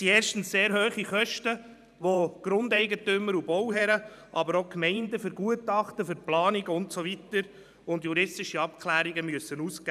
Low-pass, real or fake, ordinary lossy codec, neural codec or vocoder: 14.4 kHz; fake; none; vocoder, 44.1 kHz, 128 mel bands every 512 samples, BigVGAN v2